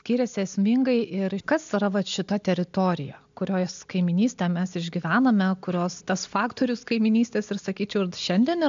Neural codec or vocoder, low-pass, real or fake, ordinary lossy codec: none; 7.2 kHz; real; MP3, 64 kbps